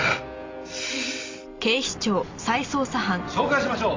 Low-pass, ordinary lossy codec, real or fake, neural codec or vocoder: 7.2 kHz; MP3, 48 kbps; real; none